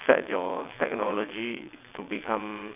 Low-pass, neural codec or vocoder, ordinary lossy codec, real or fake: 3.6 kHz; vocoder, 22.05 kHz, 80 mel bands, WaveNeXt; none; fake